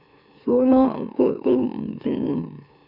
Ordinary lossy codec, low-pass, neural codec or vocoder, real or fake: none; 5.4 kHz; autoencoder, 44.1 kHz, a latent of 192 numbers a frame, MeloTTS; fake